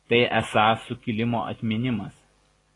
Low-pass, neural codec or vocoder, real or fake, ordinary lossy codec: 10.8 kHz; none; real; AAC, 32 kbps